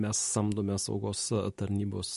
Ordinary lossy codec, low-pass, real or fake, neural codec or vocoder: MP3, 48 kbps; 14.4 kHz; real; none